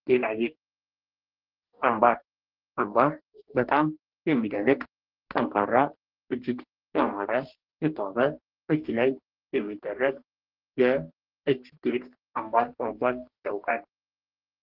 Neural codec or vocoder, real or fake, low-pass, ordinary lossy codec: codec, 44.1 kHz, 2.6 kbps, DAC; fake; 5.4 kHz; Opus, 16 kbps